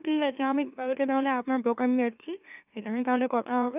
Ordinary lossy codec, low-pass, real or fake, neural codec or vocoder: none; 3.6 kHz; fake; autoencoder, 44.1 kHz, a latent of 192 numbers a frame, MeloTTS